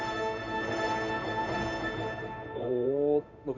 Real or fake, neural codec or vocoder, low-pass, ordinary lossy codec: fake; codec, 16 kHz in and 24 kHz out, 1 kbps, XY-Tokenizer; 7.2 kHz; none